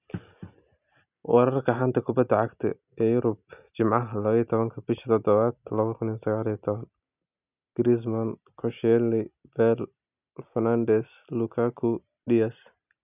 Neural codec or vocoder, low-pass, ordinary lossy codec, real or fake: none; 3.6 kHz; none; real